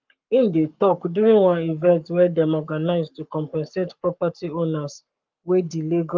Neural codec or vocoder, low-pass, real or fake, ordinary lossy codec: codec, 44.1 kHz, 7.8 kbps, Pupu-Codec; 7.2 kHz; fake; Opus, 24 kbps